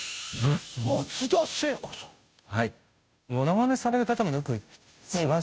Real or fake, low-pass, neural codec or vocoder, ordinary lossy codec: fake; none; codec, 16 kHz, 0.5 kbps, FunCodec, trained on Chinese and English, 25 frames a second; none